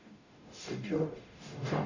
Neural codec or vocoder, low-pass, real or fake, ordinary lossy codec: codec, 44.1 kHz, 0.9 kbps, DAC; 7.2 kHz; fake; none